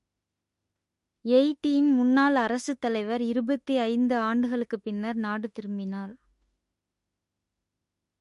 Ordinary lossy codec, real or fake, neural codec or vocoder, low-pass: MP3, 48 kbps; fake; autoencoder, 48 kHz, 32 numbers a frame, DAC-VAE, trained on Japanese speech; 14.4 kHz